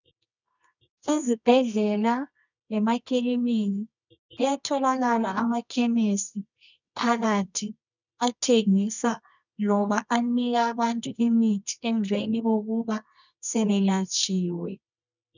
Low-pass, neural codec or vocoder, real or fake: 7.2 kHz; codec, 24 kHz, 0.9 kbps, WavTokenizer, medium music audio release; fake